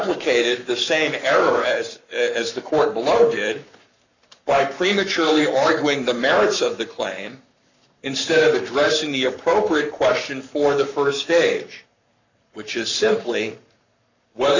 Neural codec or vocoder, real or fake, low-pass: codec, 44.1 kHz, 7.8 kbps, Pupu-Codec; fake; 7.2 kHz